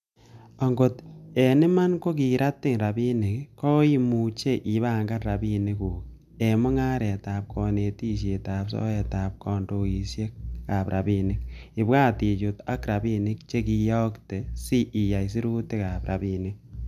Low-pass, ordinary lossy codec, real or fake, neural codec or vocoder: 14.4 kHz; none; real; none